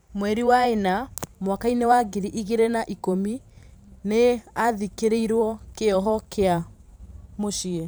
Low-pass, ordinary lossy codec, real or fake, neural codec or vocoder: none; none; fake; vocoder, 44.1 kHz, 128 mel bands every 512 samples, BigVGAN v2